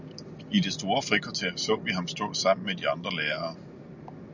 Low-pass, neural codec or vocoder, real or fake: 7.2 kHz; none; real